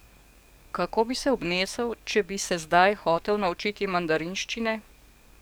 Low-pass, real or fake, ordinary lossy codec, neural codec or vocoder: none; fake; none; codec, 44.1 kHz, 7.8 kbps, DAC